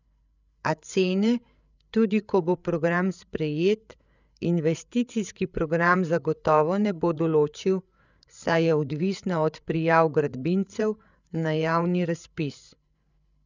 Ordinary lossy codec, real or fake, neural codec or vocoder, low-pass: none; fake; codec, 16 kHz, 8 kbps, FreqCodec, larger model; 7.2 kHz